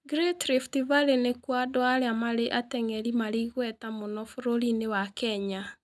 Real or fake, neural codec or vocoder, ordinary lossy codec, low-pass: real; none; none; none